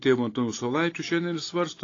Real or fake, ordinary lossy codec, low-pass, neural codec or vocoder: real; AAC, 32 kbps; 7.2 kHz; none